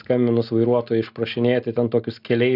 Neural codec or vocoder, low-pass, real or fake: none; 5.4 kHz; real